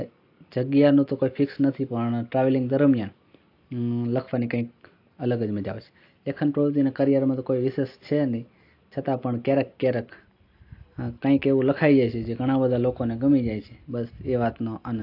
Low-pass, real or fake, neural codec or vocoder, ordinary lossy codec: 5.4 kHz; real; none; AAC, 32 kbps